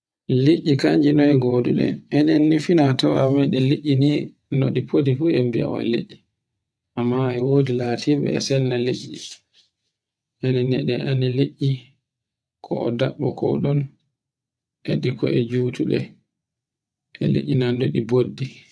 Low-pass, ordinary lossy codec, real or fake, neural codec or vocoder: none; none; fake; vocoder, 22.05 kHz, 80 mel bands, WaveNeXt